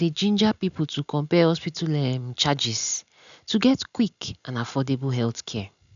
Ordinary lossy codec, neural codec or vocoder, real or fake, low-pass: none; none; real; 7.2 kHz